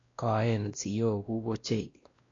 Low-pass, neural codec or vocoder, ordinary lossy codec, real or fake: 7.2 kHz; codec, 16 kHz, 1 kbps, X-Codec, WavLM features, trained on Multilingual LibriSpeech; AAC, 32 kbps; fake